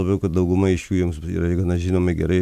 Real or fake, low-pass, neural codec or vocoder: real; 14.4 kHz; none